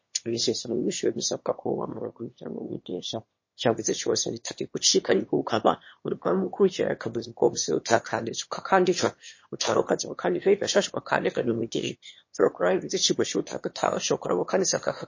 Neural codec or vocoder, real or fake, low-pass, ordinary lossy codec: autoencoder, 22.05 kHz, a latent of 192 numbers a frame, VITS, trained on one speaker; fake; 7.2 kHz; MP3, 32 kbps